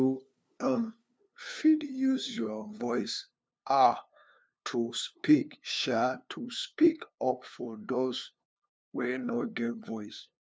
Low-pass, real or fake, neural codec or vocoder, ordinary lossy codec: none; fake; codec, 16 kHz, 2 kbps, FunCodec, trained on LibriTTS, 25 frames a second; none